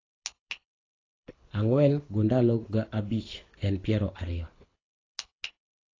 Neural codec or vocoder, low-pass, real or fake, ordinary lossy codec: codec, 24 kHz, 6 kbps, HILCodec; 7.2 kHz; fake; AAC, 32 kbps